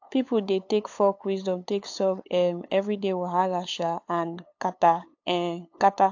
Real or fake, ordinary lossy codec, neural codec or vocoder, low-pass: fake; AAC, 48 kbps; codec, 16 kHz, 8 kbps, FunCodec, trained on LibriTTS, 25 frames a second; 7.2 kHz